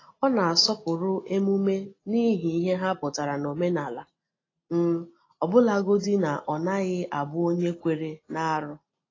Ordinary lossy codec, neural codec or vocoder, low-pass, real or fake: AAC, 32 kbps; none; 7.2 kHz; real